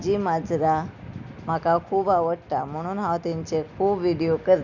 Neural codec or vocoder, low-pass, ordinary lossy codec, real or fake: none; 7.2 kHz; none; real